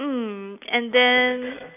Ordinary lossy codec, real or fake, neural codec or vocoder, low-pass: none; real; none; 3.6 kHz